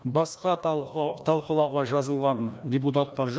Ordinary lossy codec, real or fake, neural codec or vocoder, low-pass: none; fake; codec, 16 kHz, 1 kbps, FreqCodec, larger model; none